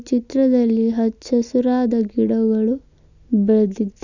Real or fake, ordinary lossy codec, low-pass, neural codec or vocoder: real; none; 7.2 kHz; none